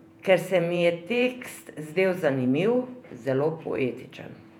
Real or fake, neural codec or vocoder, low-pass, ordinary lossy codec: fake; vocoder, 48 kHz, 128 mel bands, Vocos; 19.8 kHz; none